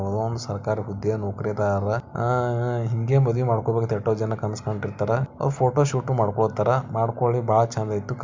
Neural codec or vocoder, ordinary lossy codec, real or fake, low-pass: none; MP3, 64 kbps; real; 7.2 kHz